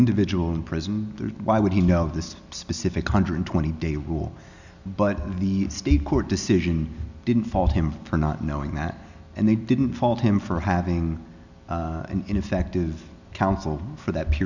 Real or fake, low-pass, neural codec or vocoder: real; 7.2 kHz; none